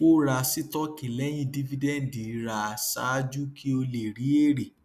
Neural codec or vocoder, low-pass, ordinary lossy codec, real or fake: none; 14.4 kHz; none; real